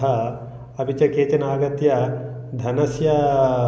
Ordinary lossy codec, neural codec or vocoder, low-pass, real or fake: none; none; none; real